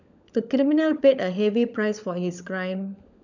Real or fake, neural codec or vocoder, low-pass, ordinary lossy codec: fake; codec, 16 kHz, 16 kbps, FunCodec, trained on LibriTTS, 50 frames a second; 7.2 kHz; none